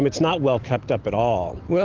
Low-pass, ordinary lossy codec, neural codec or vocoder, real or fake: 7.2 kHz; Opus, 16 kbps; none; real